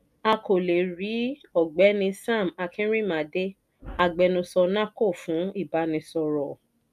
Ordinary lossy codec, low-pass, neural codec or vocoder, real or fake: none; 14.4 kHz; none; real